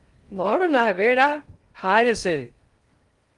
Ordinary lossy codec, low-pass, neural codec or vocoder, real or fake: Opus, 32 kbps; 10.8 kHz; codec, 16 kHz in and 24 kHz out, 0.6 kbps, FocalCodec, streaming, 2048 codes; fake